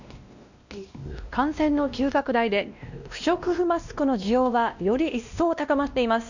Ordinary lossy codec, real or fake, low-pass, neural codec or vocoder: none; fake; 7.2 kHz; codec, 16 kHz, 1 kbps, X-Codec, WavLM features, trained on Multilingual LibriSpeech